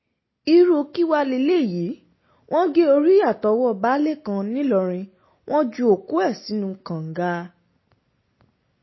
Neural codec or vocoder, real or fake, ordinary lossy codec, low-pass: none; real; MP3, 24 kbps; 7.2 kHz